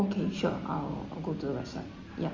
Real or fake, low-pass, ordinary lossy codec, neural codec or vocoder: real; 7.2 kHz; Opus, 32 kbps; none